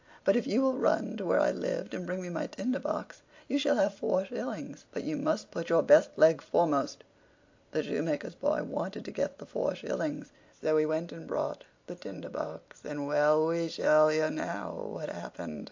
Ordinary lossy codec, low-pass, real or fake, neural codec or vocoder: AAC, 48 kbps; 7.2 kHz; real; none